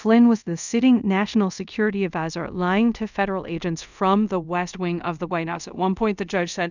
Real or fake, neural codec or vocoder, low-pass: fake; codec, 24 kHz, 0.5 kbps, DualCodec; 7.2 kHz